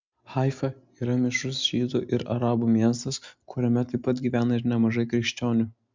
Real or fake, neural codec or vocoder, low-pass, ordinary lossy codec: real; none; 7.2 kHz; MP3, 64 kbps